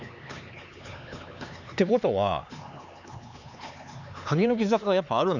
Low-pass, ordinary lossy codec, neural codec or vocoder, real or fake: 7.2 kHz; none; codec, 16 kHz, 4 kbps, X-Codec, HuBERT features, trained on LibriSpeech; fake